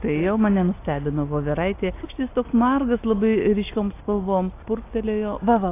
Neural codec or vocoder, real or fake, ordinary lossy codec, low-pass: none; real; AAC, 24 kbps; 3.6 kHz